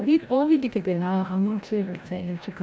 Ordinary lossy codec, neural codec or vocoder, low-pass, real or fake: none; codec, 16 kHz, 0.5 kbps, FreqCodec, larger model; none; fake